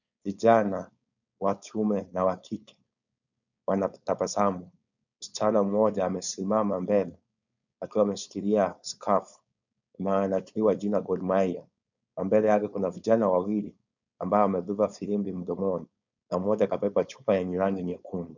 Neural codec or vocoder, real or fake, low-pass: codec, 16 kHz, 4.8 kbps, FACodec; fake; 7.2 kHz